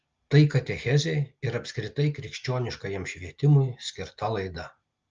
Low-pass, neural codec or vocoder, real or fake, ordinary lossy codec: 7.2 kHz; none; real; Opus, 24 kbps